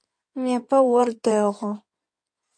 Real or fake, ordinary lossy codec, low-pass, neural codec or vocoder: fake; MP3, 48 kbps; 9.9 kHz; codec, 16 kHz in and 24 kHz out, 2.2 kbps, FireRedTTS-2 codec